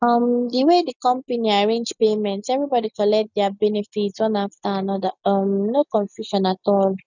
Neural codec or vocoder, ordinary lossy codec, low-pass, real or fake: none; none; 7.2 kHz; real